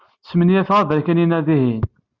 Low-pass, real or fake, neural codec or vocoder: 7.2 kHz; real; none